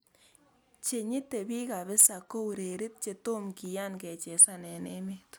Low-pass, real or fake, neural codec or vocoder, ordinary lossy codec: none; real; none; none